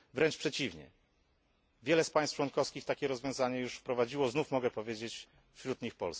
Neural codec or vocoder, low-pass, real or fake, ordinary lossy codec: none; none; real; none